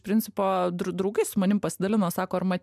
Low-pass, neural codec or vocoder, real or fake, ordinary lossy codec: 14.4 kHz; none; real; MP3, 96 kbps